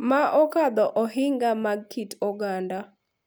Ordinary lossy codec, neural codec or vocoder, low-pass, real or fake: none; none; none; real